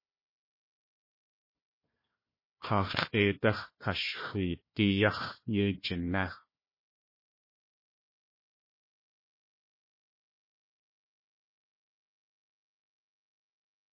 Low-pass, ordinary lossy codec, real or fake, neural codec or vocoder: 5.4 kHz; MP3, 24 kbps; fake; codec, 16 kHz, 1 kbps, FunCodec, trained on Chinese and English, 50 frames a second